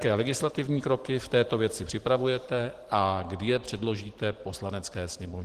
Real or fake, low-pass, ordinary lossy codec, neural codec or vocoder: fake; 14.4 kHz; Opus, 16 kbps; vocoder, 44.1 kHz, 128 mel bands every 512 samples, BigVGAN v2